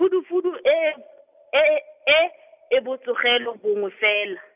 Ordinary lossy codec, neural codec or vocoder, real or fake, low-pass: none; none; real; 3.6 kHz